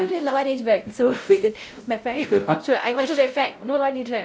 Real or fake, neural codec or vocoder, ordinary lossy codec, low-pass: fake; codec, 16 kHz, 0.5 kbps, X-Codec, WavLM features, trained on Multilingual LibriSpeech; none; none